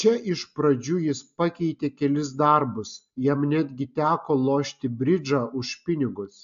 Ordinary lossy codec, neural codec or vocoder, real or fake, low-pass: AAC, 64 kbps; none; real; 7.2 kHz